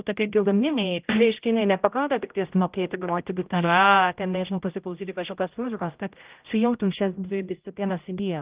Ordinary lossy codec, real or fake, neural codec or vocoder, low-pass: Opus, 24 kbps; fake; codec, 16 kHz, 0.5 kbps, X-Codec, HuBERT features, trained on general audio; 3.6 kHz